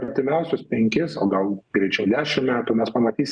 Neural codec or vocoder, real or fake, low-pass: none; real; 9.9 kHz